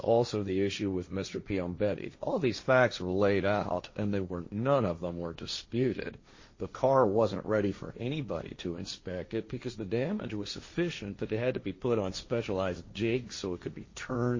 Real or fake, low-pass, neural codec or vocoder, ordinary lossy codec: fake; 7.2 kHz; codec, 16 kHz, 1.1 kbps, Voila-Tokenizer; MP3, 32 kbps